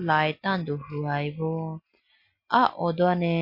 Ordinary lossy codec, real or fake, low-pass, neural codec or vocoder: MP3, 32 kbps; real; 5.4 kHz; none